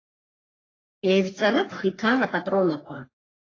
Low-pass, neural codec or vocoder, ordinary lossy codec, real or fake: 7.2 kHz; codec, 44.1 kHz, 3.4 kbps, Pupu-Codec; AAC, 32 kbps; fake